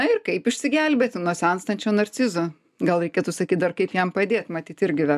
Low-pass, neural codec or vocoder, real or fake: 14.4 kHz; none; real